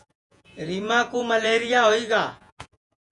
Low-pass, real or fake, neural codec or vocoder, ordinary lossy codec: 10.8 kHz; fake; vocoder, 48 kHz, 128 mel bands, Vocos; MP3, 96 kbps